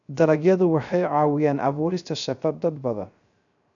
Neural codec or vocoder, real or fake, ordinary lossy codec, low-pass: codec, 16 kHz, 0.3 kbps, FocalCodec; fake; none; 7.2 kHz